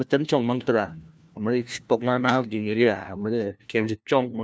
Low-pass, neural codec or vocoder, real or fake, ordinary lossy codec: none; codec, 16 kHz, 1 kbps, FunCodec, trained on LibriTTS, 50 frames a second; fake; none